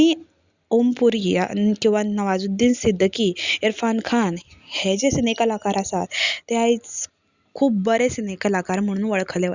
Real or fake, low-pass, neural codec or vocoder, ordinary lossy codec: real; 7.2 kHz; none; Opus, 64 kbps